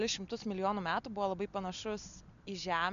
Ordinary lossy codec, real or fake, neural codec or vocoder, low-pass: MP3, 64 kbps; real; none; 7.2 kHz